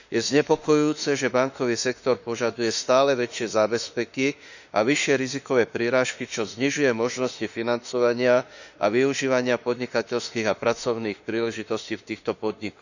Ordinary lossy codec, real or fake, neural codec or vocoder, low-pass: none; fake; autoencoder, 48 kHz, 32 numbers a frame, DAC-VAE, trained on Japanese speech; 7.2 kHz